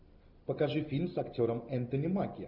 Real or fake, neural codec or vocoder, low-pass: real; none; 5.4 kHz